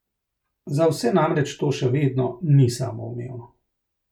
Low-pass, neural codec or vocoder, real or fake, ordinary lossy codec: 19.8 kHz; none; real; none